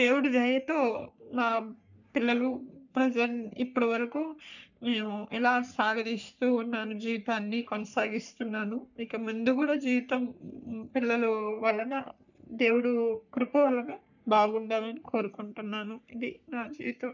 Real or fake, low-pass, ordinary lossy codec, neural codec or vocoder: fake; 7.2 kHz; none; codec, 44.1 kHz, 3.4 kbps, Pupu-Codec